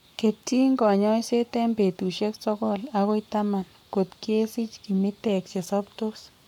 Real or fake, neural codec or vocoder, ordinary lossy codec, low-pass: fake; codec, 44.1 kHz, 7.8 kbps, Pupu-Codec; none; 19.8 kHz